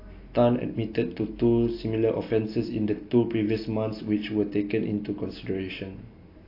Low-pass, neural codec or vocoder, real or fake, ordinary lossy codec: 5.4 kHz; none; real; AAC, 32 kbps